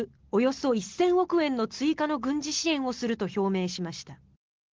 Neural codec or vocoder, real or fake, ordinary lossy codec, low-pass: none; real; Opus, 16 kbps; 7.2 kHz